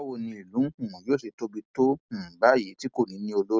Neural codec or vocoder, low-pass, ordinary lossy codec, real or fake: none; none; none; real